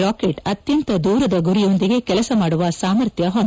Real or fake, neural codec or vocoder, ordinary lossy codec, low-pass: real; none; none; none